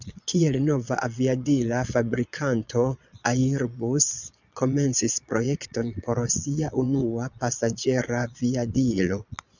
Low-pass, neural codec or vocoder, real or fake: 7.2 kHz; vocoder, 22.05 kHz, 80 mel bands, Vocos; fake